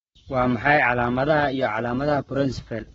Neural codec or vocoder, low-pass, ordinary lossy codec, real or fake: codec, 44.1 kHz, 7.8 kbps, Pupu-Codec; 19.8 kHz; AAC, 24 kbps; fake